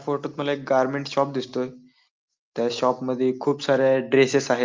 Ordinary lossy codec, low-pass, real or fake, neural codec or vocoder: Opus, 32 kbps; 7.2 kHz; real; none